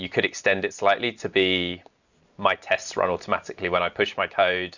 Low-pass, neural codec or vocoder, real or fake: 7.2 kHz; none; real